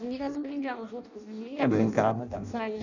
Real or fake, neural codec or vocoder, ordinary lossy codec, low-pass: fake; codec, 16 kHz in and 24 kHz out, 0.6 kbps, FireRedTTS-2 codec; none; 7.2 kHz